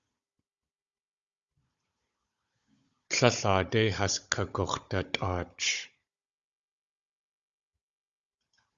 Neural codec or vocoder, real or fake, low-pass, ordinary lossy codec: codec, 16 kHz, 16 kbps, FunCodec, trained on Chinese and English, 50 frames a second; fake; 7.2 kHz; Opus, 64 kbps